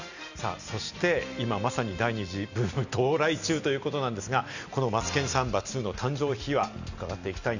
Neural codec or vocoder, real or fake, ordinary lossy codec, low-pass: none; real; none; 7.2 kHz